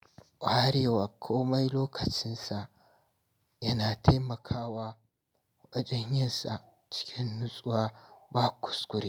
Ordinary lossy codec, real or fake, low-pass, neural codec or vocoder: none; real; none; none